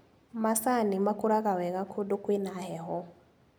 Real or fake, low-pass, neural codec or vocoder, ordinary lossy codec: real; none; none; none